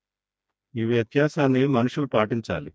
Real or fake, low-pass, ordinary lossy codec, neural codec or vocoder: fake; none; none; codec, 16 kHz, 2 kbps, FreqCodec, smaller model